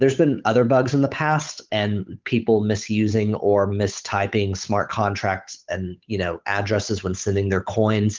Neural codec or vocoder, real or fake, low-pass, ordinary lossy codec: codec, 16 kHz, 8 kbps, FunCodec, trained on Chinese and English, 25 frames a second; fake; 7.2 kHz; Opus, 32 kbps